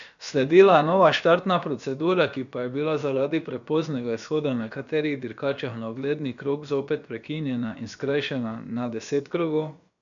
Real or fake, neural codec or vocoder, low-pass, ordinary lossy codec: fake; codec, 16 kHz, about 1 kbps, DyCAST, with the encoder's durations; 7.2 kHz; none